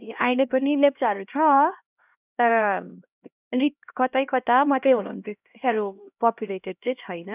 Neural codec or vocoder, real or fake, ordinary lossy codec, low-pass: codec, 16 kHz, 1 kbps, X-Codec, HuBERT features, trained on LibriSpeech; fake; none; 3.6 kHz